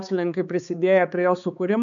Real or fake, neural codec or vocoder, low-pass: fake; codec, 16 kHz, 4 kbps, X-Codec, HuBERT features, trained on balanced general audio; 7.2 kHz